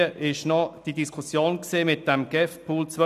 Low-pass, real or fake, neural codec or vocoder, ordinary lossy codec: 14.4 kHz; real; none; none